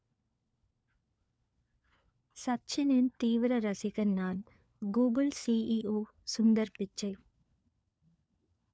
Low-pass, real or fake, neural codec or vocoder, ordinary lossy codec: none; fake; codec, 16 kHz, 4 kbps, FunCodec, trained on LibriTTS, 50 frames a second; none